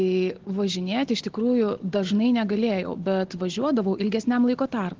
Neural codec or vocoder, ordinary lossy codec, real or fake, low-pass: none; Opus, 16 kbps; real; 7.2 kHz